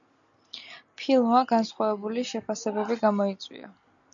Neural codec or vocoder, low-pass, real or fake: none; 7.2 kHz; real